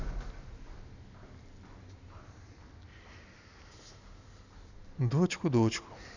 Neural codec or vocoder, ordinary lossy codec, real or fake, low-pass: none; none; real; 7.2 kHz